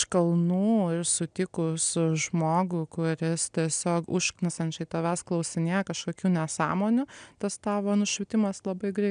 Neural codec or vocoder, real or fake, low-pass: none; real; 9.9 kHz